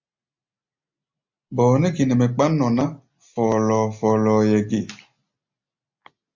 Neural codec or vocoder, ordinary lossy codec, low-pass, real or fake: none; MP3, 48 kbps; 7.2 kHz; real